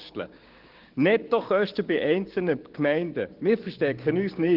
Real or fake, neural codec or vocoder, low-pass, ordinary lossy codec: fake; codec, 16 kHz, 6 kbps, DAC; 5.4 kHz; Opus, 16 kbps